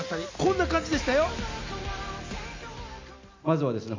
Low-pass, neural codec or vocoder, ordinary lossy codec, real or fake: 7.2 kHz; none; MP3, 64 kbps; real